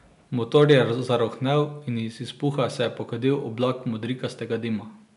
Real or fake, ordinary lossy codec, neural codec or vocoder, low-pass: real; none; none; 10.8 kHz